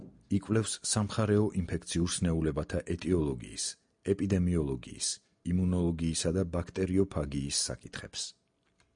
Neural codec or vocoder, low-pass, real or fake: none; 9.9 kHz; real